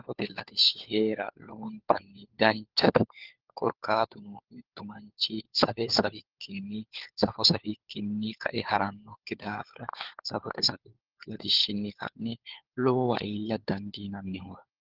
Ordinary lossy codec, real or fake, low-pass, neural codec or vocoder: Opus, 16 kbps; fake; 5.4 kHz; codec, 16 kHz, 4 kbps, FunCodec, trained on LibriTTS, 50 frames a second